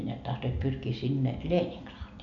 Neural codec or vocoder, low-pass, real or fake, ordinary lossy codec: none; 7.2 kHz; real; none